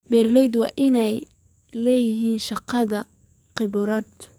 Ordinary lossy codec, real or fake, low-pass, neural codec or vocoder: none; fake; none; codec, 44.1 kHz, 2.6 kbps, SNAC